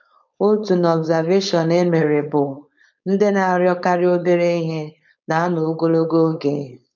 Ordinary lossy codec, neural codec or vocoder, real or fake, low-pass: none; codec, 16 kHz, 4.8 kbps, FACodec; fake; 7.2 kHz